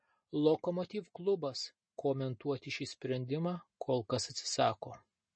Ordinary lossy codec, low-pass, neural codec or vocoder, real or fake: MP3, 32 kbps; 9.9 kHz; none; real